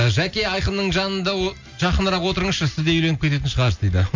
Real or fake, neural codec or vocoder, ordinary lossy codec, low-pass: real; none; MP3, 48 kbps; 7.2 kHz